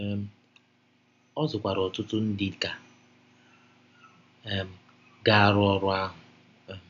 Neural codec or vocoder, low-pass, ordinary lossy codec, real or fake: none; 7.2 kHz; none; real